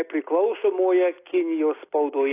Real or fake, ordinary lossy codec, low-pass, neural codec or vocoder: real; AAC, 24 kbps; 3.6 kHz; none